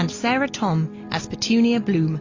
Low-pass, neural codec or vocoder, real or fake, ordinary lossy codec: 7.2 kHz; none; real; AAC, 32 kbps